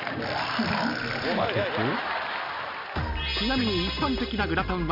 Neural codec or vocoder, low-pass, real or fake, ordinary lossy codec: none; 5.4 kHz; real; Opus, 64 kbps